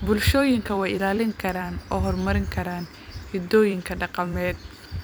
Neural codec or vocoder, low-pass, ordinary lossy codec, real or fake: vocoder, 44.1 kHz, 128 mel bands every 256 samples, BigVGAN v2; none; none; fake